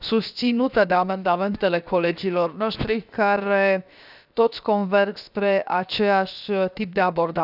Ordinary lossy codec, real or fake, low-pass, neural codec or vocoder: none; fake; 5.4 kHz; codec, 16 kHz, 0.7 kbps, FocalCodec